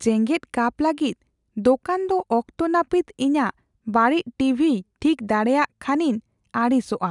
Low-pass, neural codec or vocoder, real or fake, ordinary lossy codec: 10.8 kHz; none; real; none